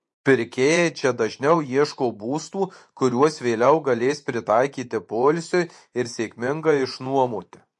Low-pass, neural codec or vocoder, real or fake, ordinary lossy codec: 10.8 kHz; vocoder, 24 kHz, 100 mel bands, Vocos; fake; MP3, 48 kbps